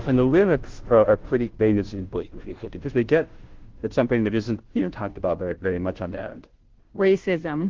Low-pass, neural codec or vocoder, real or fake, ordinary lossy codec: 7.2 kHz; codec, 16 kHz, 0.5 kbps, FunCodec, trained on Chinese and English, 25 frames a second; fake; Opus, 16 kbps